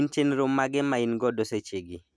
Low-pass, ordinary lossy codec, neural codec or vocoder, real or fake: none; none; none; real